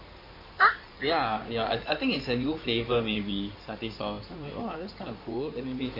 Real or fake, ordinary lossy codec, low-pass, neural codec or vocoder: fake; MP3, 32 kbps; 5.4 kHz; codec, 16 kHz in and 24 kHz out, 2.2 kbps, FireRedTTS-2 codec